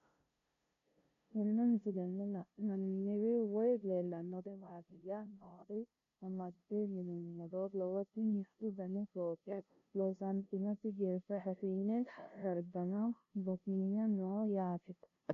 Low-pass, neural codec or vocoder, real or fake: 7.2 kHz; codec, 16 kHz, 0.5 kbps, FunCodec, trained on LibriTTS, 25 frames a second; fake